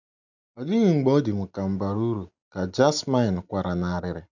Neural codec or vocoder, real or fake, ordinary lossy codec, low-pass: none; real; none; 7.2 kHz